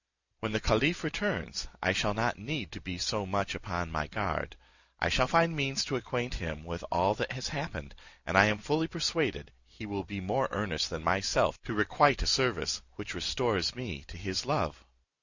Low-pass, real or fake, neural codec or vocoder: 7.2 kHz; real; none